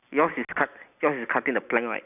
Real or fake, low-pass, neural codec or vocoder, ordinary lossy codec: real; 3.6 kHz; none; none